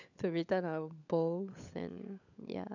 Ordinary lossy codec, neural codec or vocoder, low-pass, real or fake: none; codec, 16 kHz, 16 kbps, FunCodec, trained on LibriTTS, 50 frames a second; 7.2 kHz; fake